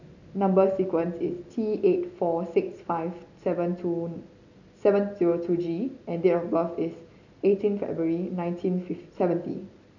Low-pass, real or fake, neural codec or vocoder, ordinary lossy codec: 7.2 kHz; real; none; none